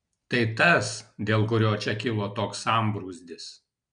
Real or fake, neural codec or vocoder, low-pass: real; none; 9.9 kHz